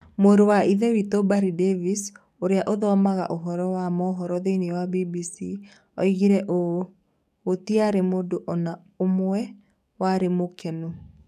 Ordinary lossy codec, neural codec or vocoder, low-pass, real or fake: none; codec, 44.1 kHz, 7.8 kbps, DAC; 14.4 kHz; fake